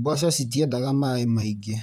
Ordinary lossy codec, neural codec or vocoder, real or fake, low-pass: none; vocoder, 44.1 kHz, 128 mel bands, Pupu-Vocoder; fake; 19.8 kHz